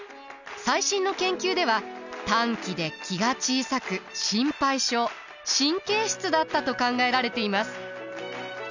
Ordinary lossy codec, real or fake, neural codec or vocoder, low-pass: none; real; none; 7.2 kHz